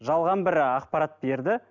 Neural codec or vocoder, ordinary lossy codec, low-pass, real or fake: none; none; 7.2 kHz; real